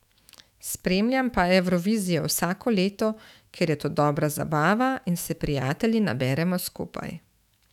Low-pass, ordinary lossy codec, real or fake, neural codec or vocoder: 19.8 kHz; none; fake; autoencoder, 48 kHz, 128 numbers a frame, DAC-VAE, trained on Japanese speech